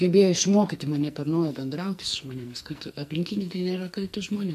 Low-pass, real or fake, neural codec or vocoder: 14.4 kHz; fake; codec, 44.1 kHz, 2.6 kbps, SNAC